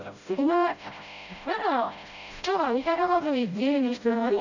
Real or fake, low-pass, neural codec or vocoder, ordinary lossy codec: fake; 7.2 kHz; codec, 16 kHz, 0.5 kbps, FreqCodec, smaller model; none